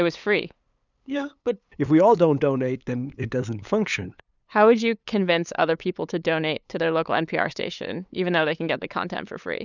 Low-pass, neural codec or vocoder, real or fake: 7.2 kHz; codec, 16 kHz, 8 kbps, FunCodec, trained on LibriTTS, 25 frames a second; fake